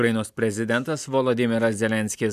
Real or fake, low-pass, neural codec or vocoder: real; 14.4 kHz; none